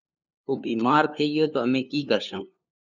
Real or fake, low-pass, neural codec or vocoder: fake; 7.2 kHz; codec, 16 kHz, 2 kbps, FunCodec, trained on LibriTTS, 25 frames a second